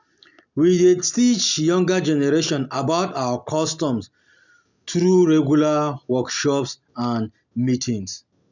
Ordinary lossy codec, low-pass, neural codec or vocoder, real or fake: none; 7.2 kHz; none; real